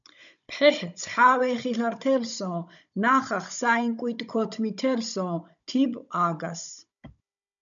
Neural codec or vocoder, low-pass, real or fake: codec, 16 kHz, 16 kbps, FunCodec, trained on Chinese and English, 50 frames a second; 7.2 kHz; fake